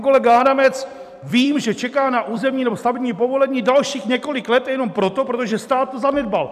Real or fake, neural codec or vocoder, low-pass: fake; vocoder, 44.1 kHz, 128 mel bands every 256 samples, BigVGAN v2; 14.4 kHz